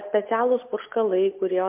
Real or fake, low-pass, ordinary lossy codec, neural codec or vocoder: real; 3.6 kHz; MP3, 32 kbps; none